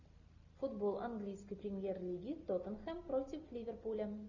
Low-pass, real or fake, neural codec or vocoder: 7.2 kHz; real; none